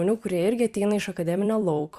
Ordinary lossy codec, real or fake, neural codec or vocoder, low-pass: Opus, 64 kbps; fake; vocoder, 44.1 kHz, 128 mel bands every 256 samples, BigVGAN v2; 14.4 kHz